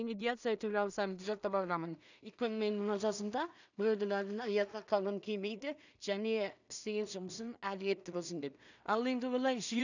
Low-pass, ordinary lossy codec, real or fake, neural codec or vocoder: 7.2 kHz; none; fake; codec, 16 kHz in and 24 kHz out, 0.4 kbps, LongCat-Audio-Codec, two codebook decoder